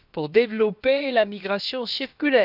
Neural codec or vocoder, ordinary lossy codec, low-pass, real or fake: codec, 16 kHz, about 1 kbps, DyCAST, with the encoder's durations; none; 5.4 kHz; fake